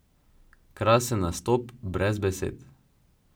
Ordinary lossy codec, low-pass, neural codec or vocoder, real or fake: none; none; none; real